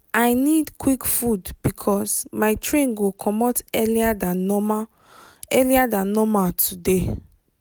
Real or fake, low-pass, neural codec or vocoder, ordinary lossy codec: real; none; none; none